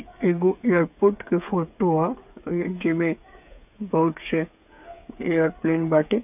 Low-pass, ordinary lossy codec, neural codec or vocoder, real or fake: 3.6 kHz; none; codec, 16 kHz, 8 kbps, FreqCodec, smaller model; fake